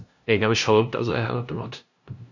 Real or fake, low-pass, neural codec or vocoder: fake; 7.2 kHz; codec, 16 kHz, 0.5 kbps, FunCodec, trained on LibriTTS, 25 frames a second